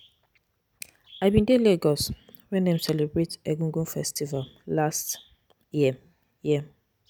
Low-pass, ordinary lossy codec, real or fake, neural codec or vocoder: none; none; real; none